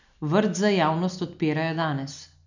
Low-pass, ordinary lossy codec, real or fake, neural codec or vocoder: 7.2 kHz; none; real; none